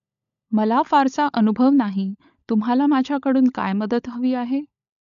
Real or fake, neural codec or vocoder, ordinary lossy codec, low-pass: fake; codec, 16 kHz, 16 kbps, FunCodec, trained on LibriTTS, 50 frames a second; none; 7.2 kHz